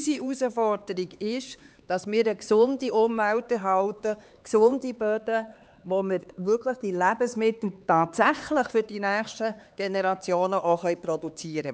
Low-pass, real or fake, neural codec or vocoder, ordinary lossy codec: none; fake; codec, 16 kHz, 4 kbps, X-Codec, HuBERT features, trained on LibriSpeech; none